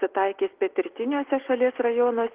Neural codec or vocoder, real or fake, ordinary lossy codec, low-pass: none; real; Opus, 16 kbps; 3.6 kHz